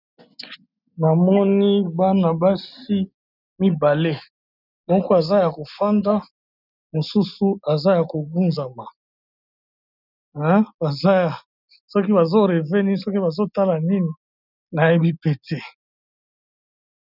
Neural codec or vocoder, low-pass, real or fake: none; 5.4 kHz; real